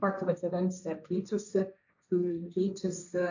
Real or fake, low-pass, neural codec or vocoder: fake; 7.2 kHz; codec, 16 kHz, 1.1 kbps, Voila-Tokenizer